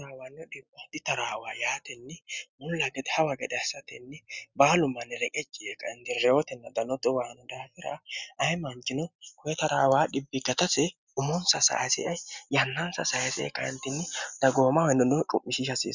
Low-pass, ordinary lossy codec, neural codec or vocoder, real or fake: 7.2 kHz; Opus, 64 kbps; none; real